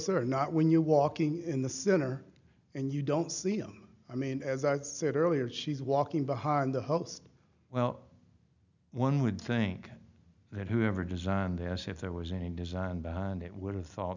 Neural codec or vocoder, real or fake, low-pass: none; real; 7.2 kHz